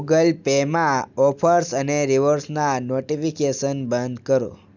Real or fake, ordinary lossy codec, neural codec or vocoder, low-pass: real; none; none; 7.2 kHz